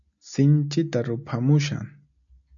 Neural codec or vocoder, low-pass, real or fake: none; 7.2 kHz; real